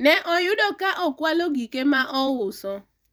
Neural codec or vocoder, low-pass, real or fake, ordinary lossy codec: vocoder, 44.1 kHz, 128 mel bands every 512 samples, BigVGAN v2; none; fake; none